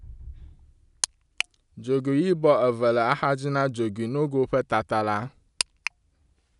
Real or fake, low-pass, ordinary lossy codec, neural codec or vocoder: real; 10.8 kHz; none; none